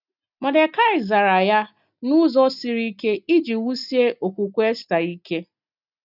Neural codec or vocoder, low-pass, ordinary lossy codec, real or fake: none; 5.4 kHz; none; real